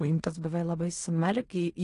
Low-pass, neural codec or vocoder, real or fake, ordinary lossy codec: 10.8 kHz; codec, 16 kHz in and 24 kHz out, 0.4 kbps, LongCat-Audio-Codec, fine tuned four codebook decoder; fake; AAC, 48 kbps